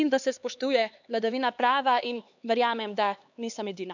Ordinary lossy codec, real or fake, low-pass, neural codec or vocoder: none; fake; 7.2 kHz; codec, 16 kHz, 4 kbps, X-Codec, HuBERT features, trained on LibriSpeech